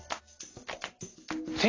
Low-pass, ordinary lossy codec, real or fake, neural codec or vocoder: 7.2 kHz; AAC, 32 kbps; real; none